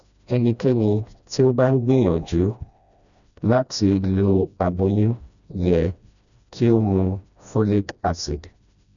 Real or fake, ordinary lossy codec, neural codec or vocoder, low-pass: fake; none; codec, 16 kHz, 1 kbps, FreqCodec, smaller model; 7.2 kHz